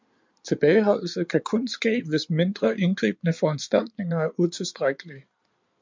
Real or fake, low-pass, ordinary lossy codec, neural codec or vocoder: fake; 7.2 kHz; MP3, 64 kbps; codec, 16 kHz in and 24 kHz out, 2.2 kbps, FireRedTTS-2 codec